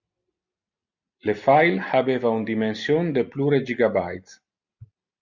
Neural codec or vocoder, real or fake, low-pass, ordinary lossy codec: none; real; 7.2 kHz; Opus, 64 kbps